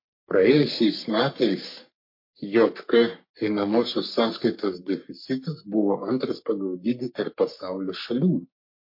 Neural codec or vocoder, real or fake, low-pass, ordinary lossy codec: codec, 44.1 kHz, 3.4 kbps, Pupu-Codec; fake; 5.4 kHz; MP3, 32 kbps